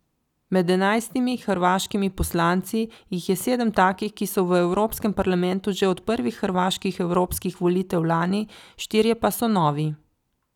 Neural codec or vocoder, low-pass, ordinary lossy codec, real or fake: none; 19.8 kHz; none; real